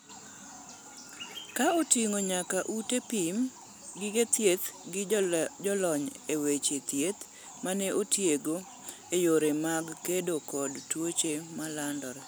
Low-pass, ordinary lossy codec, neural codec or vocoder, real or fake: none; none; none; real